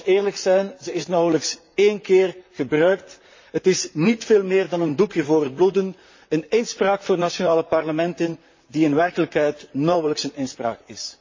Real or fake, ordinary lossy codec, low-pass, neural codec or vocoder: fake; MP3, 32 kbps; 7.2 kHz; vocoder, 44.1 kHz, 128 mel bands, Pupu-Vocoder